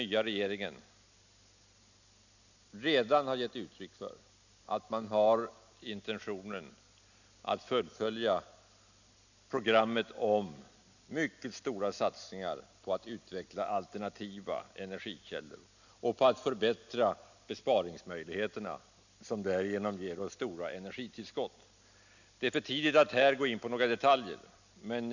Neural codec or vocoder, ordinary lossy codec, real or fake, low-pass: none; none; real; 7.2 kHz